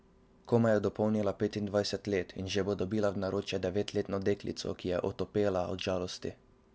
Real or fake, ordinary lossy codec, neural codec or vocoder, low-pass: real; none; none; none